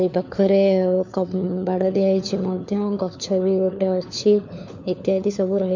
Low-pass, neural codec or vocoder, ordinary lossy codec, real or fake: 7.2 kHz; codec, 16 kHz, 4 kbps, FunCodec, trained on LibriTTS, 50 frames a second; none; fake